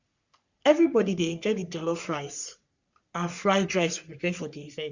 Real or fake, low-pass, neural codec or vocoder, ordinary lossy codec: fake; 7.2 kHz; codec, 44.1 kHz, 3.4 kbps, Pupu-Codec; Opus, 64 kbps